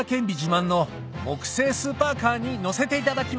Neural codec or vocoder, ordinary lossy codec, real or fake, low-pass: none; none; real; none